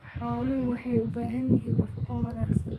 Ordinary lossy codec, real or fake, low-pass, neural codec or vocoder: none; fake; 14.4 kHz; codec, 32 kHz, 1.9 kbps, SNAC